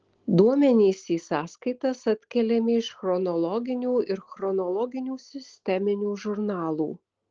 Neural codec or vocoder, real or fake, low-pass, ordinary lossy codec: none; real; 7.2 kHz; Opus, 16 kbps